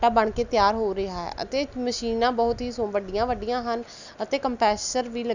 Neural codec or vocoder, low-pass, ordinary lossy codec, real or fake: none; 7.2 kHz; none; real